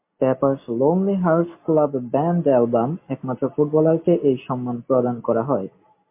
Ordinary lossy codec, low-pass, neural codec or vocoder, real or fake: MP3, 24 kbps; 3.6 kHz; none; real